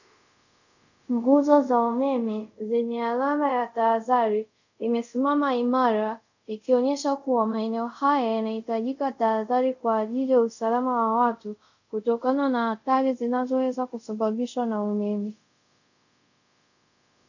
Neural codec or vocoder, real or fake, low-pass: codec, 24 kHz, 0.5 kbps, DualCodec; fake; 7.2 kHz